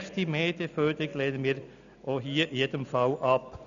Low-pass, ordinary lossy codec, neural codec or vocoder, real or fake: 7.2 kHz; none; none; real